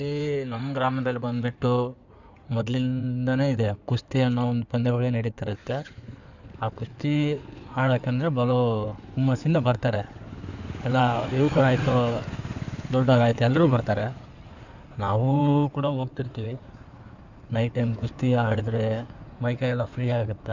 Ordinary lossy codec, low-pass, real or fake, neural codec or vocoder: none; 7.2 kHz; fake; codec, 16 kHz in and 24 kHz out, 2.2 kbps, FireRedTTS-2 codec